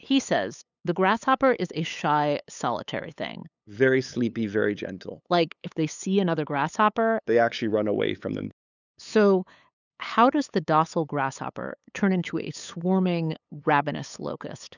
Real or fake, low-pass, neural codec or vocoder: fake; 7.2 kHz; codec, 16 kHz, 8 kbps, FunCodec, trained on LibriTTS, 25 frames a second